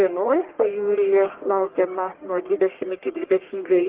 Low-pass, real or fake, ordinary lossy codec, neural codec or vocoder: 3.6 kHz; fake; Opus, 16 kbps; codec, 44.1 kHz, 1.7 kbps, Pupu-Codec